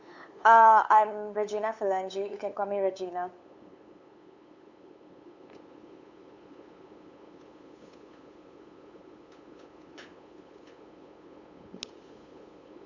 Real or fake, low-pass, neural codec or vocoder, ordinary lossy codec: fake; 7.2 kHz; codec, 16 kHz, 8 kbps, FunCodec, trained on LibriTTS, 25 frames a second; Opus, 64 kbps